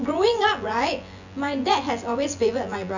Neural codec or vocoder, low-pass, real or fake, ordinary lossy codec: vocoder, 24 kHz, 100 mel bands, Vocos; 7.2 kHz; fake; none